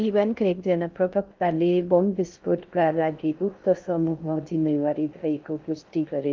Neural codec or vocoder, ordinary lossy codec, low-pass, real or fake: codec, 16 kHz in and 24 kHz out, 0.6 kbps, FocalCodec, streaming, 2048 codes; Opus, 32 kbps; 7.2 kHz; fake